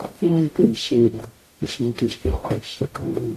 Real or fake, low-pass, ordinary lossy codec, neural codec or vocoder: fake; 14.4 kHz; AAC, 64 kbps; codec, 44.1 kHz, 0.9 kbps, DAC